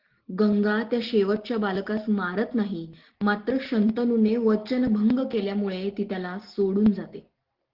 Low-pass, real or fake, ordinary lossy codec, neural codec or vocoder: 5.4 kHz; real; Opus, 16 kbps; none